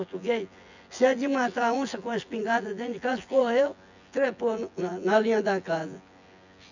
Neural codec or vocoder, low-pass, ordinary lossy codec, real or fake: vocoder, 24 kHz, 100 mel bands, Vocos; 7.2 kHz; none; fake